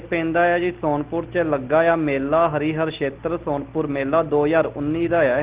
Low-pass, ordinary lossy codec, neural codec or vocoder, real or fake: 3.6 kHz; Opus, 16 kbps; none; real